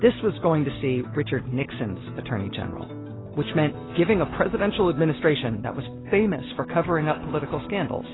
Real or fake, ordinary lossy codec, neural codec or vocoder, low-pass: real; AAC, 16 kbps; none; 7.2 kHz